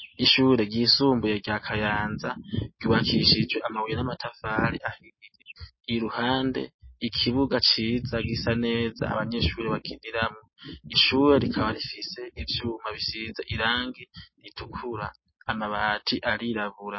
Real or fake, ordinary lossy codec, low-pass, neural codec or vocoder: real; MP3, 24 kbps; 7.2 kHz; none